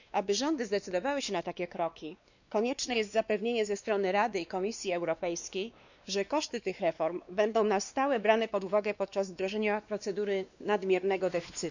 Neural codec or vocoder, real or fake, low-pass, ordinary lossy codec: codec, 16 kHz, 2 kbps, X-Codec, WavLM features, trained on Multilingual LibriSpeech; fake; 7.2 kHz; none